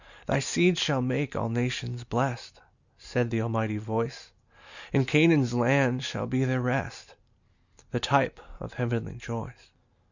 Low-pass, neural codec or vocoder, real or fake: 7.2 kHz; none; real